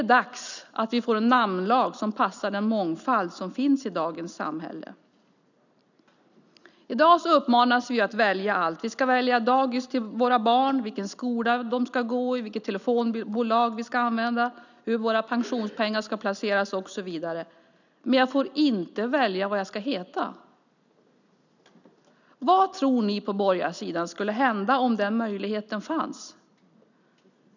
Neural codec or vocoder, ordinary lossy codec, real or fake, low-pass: none; none; real; 7.2 kHz